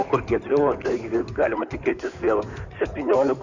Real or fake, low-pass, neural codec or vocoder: fake; 7.2 kHz; codec, 16 kHz in and 24 kHz out, 2.2 kbps, FireRedTTS-2 codec